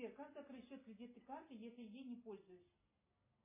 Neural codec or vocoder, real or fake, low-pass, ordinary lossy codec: none; real; 3.6 kHz; MP3, 16 kbps